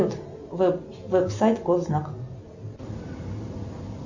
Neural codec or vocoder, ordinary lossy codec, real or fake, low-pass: none; Opus, 64 kbps; real; 7.2 kHz